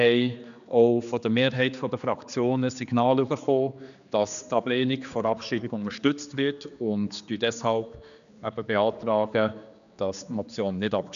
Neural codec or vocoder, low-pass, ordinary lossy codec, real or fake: codec, 16 kHz, 2 kbps, X-Codec, HuBERT features, trained on general audio; 7.2 kHz; none; fake